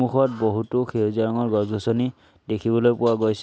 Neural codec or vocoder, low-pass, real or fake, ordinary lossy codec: none; none; real; none